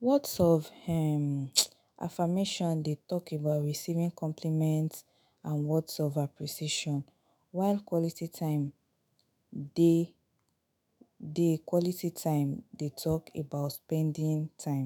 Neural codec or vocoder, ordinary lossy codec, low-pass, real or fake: autoencoder, 48 kHz, 128 numbers a frame, DAC-VAE, trained on Japanese speech; none; none; fake